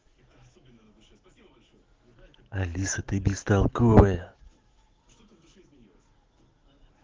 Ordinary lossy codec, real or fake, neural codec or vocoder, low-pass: Opus, 16 kbps; fake; autoencoder, 48 kHz, 128 numbers a frame, DAC-VAE, trained on Japanese speech; 7.2 kHz